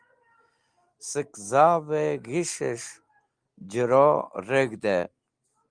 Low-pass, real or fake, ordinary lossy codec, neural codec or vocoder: 9.9 kHz; real; Opus, 24 kbps; none